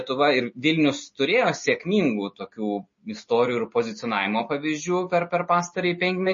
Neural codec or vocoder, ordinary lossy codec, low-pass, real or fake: none; MP3, 32 kbps; 7.2 kHz; real